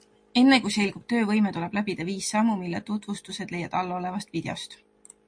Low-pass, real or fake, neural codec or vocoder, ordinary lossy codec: 9.9 kHz; real; none; MP3, 48 kbps